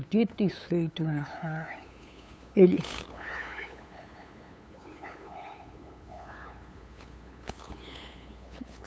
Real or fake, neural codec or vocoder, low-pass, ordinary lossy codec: fake; codec, 16 kHz, 8 kbps, FunCodec, trained on LibriTTS, 25 frames a second; none; none